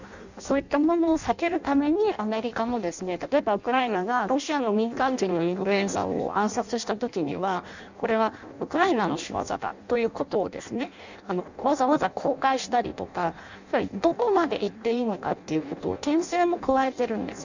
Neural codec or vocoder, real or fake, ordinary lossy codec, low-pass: codec, 16 kHz in and 24 kHz out, 0.6 kbps, FireRedTTS-2 codec; fake; none; 7.2 kHz